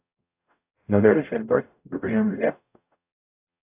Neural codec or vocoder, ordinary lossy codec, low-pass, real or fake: codec, 44.1 kHz, 0.9 kbps, DAC; AAC, 32 kbps; 3.6 kHz; fake